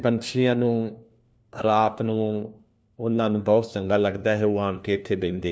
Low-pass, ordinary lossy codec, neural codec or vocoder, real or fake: none; none; codec, 16 kHz, 1 kbps, FunCodec, trained on LibriTTS, 50 frames a second; fake